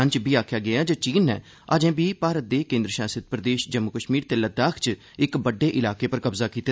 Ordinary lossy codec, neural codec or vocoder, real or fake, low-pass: none; none; real; none